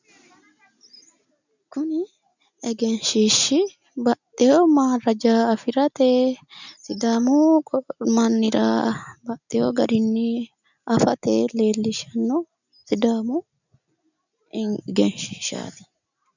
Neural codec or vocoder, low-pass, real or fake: none; 7.2 kHz; real